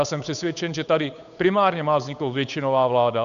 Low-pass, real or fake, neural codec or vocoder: 7.2 kHz; fake; codec, 16 kHz, 8 kbps, FunCodec, trained on Chinese and English, 25 frames a second